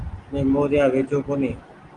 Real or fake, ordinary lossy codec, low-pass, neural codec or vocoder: real; Opus, 24 kbps; 10.8 kHz; none